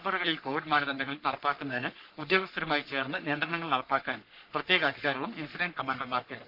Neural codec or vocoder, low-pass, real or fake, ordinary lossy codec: codec, 44.1 kHz, 3.4 kbps, Pupu-Codec; 5.4 kHz; fake; none